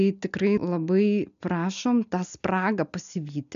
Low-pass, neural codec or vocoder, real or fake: 7.2 kHz; none; real